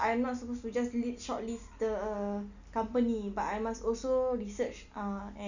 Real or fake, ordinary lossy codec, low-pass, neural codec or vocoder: real; none; 7.2 kHz; none